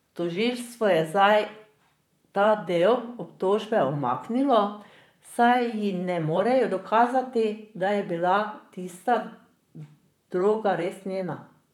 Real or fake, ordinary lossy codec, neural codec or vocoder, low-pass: fake; none; vocoder, 44.1 kHz, 128 mel bands, Pupu-Vocoder; 19.8 kHz